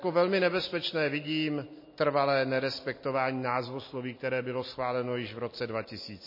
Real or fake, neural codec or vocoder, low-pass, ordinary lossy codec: real; none; 5.4 kHz; MP3, 24 kbps